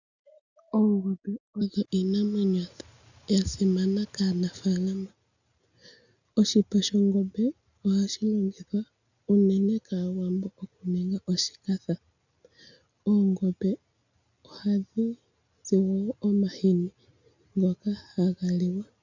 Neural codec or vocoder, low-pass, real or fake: none; 7.2 kHz; real